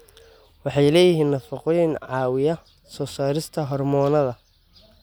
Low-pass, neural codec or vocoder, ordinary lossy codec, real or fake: none; none; none; real